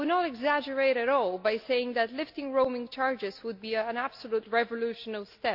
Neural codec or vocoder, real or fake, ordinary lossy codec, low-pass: none; real; AAC, 48 kbps; 5.4 kHz